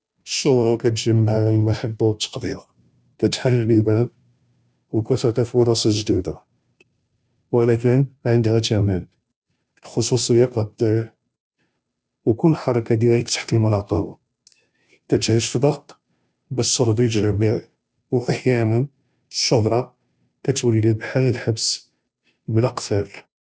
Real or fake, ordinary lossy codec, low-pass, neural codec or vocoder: fake; none; none; codec, 16 kHz, 0.5 kbps, FunCodec, trained on Chinese and English, 25 frames a second